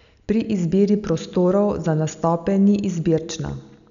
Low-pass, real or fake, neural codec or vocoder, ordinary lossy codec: 7.2 kHz; real; none; none